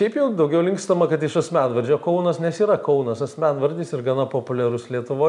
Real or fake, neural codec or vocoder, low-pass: real; none; 10.8 kHz